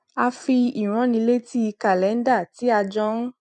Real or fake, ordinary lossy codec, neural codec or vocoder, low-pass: fake; none; vocoder, 44.1 kHz, 128 mel bands every 256 samples, BigVGAN v2; 10.8 kHz